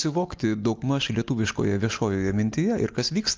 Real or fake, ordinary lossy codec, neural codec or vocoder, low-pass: real; Opus, 32 kbps; none; 7.2 kHz